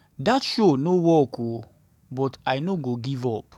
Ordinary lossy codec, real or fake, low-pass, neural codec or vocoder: none; fake; 19.8 kHz; codec, 44.1 kHz, 7.8 kbps, DAC